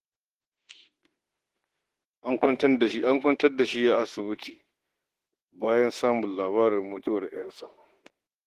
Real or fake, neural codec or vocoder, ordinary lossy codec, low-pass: fake; autoencoder, 48 kHz, 32 numbers a frame, DAC-VAE, trained on Japanese speech; Opus, 16 kbps; 14.4 kHz